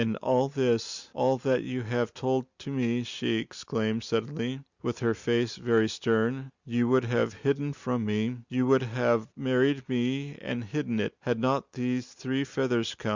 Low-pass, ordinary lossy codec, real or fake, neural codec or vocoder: 7.2 kHz; Opus, 64 kbps; real; none